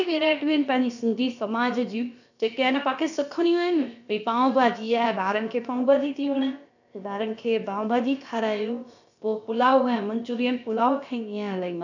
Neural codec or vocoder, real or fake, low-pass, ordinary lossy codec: codec, 16 kHz, about 1 kbps, DyCAST, with the encoder's durations; fake; 7.2 kHz; none